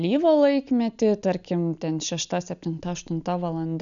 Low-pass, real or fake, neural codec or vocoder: 7.2 kHz; real; none